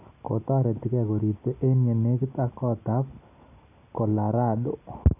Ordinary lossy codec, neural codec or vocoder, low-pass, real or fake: none; none; 3.6 kHz; real